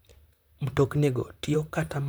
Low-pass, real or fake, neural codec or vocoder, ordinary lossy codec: none; fake; vocoder, 44.1 kHz, 128 mel bands, Pupu-Vocoder; none